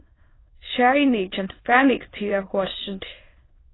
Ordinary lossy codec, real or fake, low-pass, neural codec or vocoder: AAC, 16 kbps; fake; 7.2 kHz; autoencoder, 22.05 kHz, a latent of 192 numbers a frame, VITS, trained on many speakers